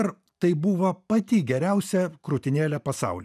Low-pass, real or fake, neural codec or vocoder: 14.4 kHz; fake; vocoder, 44.1 kHz, 128 mel bands every 512 samples, BigVGAN v2